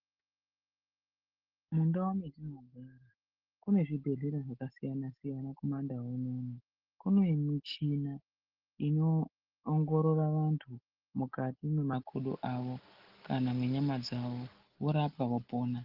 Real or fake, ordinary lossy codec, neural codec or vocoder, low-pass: real; Opus, 16 kbps; none; 5.4 kHz